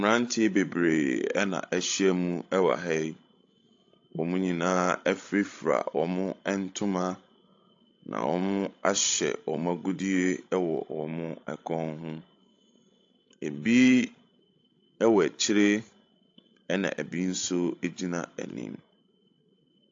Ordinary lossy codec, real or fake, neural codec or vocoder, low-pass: AAC, 48 kbps; fake; codec, 16 kHz, 16 kbps, FreqCodec, larger model; 7.2 kHz